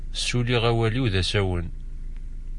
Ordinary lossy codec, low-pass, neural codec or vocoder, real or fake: MP3, 48 kbps; 10.8 kHz; none; real